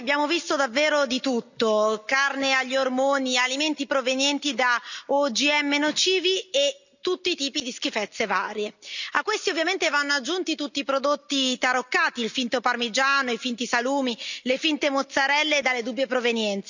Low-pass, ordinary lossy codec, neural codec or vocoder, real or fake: 7.2 kHz; none; none; real